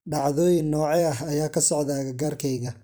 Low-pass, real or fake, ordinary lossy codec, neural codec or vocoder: none; fake; none; vocoder, 44.1 kHz, 128 mel bands every 256 samples, BigVGAN v2